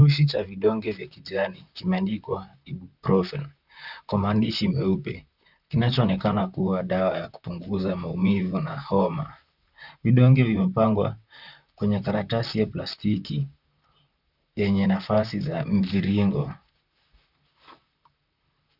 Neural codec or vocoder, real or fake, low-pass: vocoder, 44.1 kHz, 128 mel bands, Pupu-Vocoder; fake; 5.4 kHz